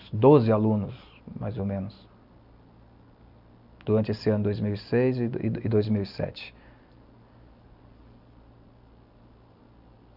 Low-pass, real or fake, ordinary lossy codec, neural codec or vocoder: 5.4 kHz; real; none; none